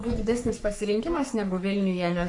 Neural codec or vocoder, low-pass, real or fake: codec, 44.1 kHz, 3.4 kbps, Pupu-Codec; 10.8 kHz; fake